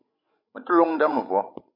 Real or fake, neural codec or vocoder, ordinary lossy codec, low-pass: fake; codec, 16 kHz, 16 kbps, FreqCodec, larger model; MP3, 32 kbps; 5.4 kHz